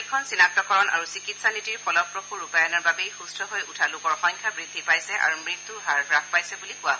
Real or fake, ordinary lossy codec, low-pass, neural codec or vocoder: real; none; none; none